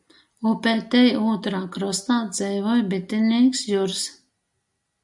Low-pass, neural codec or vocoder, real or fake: 10.8 kHz; none; real